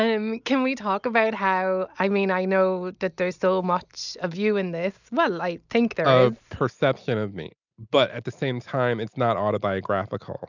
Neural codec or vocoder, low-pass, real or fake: none; 7.2 kHz; real